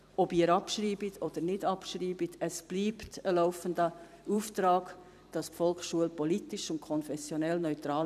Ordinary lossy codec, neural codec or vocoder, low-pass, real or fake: none; vocoder, 44.1 kHz, 128 mel bands every 256 samples, BigVGAN v2; 14.4 kHz; fake